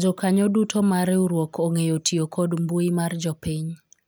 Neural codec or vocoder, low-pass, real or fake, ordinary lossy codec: none; none; real; none